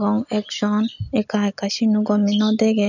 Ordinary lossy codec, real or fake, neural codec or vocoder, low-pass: none; real; none; 7.2 kHz